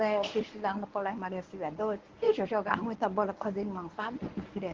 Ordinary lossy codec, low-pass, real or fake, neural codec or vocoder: Opus, 16 kbps; 7.2 kHz; fake; codec, 24 kHz, 0.9 kbps, WavTokenizer, medium speech release version 1